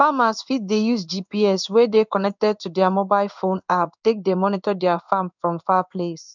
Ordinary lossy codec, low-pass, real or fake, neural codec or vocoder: none; 7.2 kHz; fake; codec, 16 kHz in and 24 kHz out, 1 kbps, XY-Tokenizer